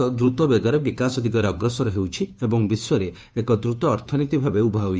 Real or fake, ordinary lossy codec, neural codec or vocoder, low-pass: fake; none; codec, 16 kHz, 2 kbps, FunCodec, trained on Chinese and English, 25 frames a second; none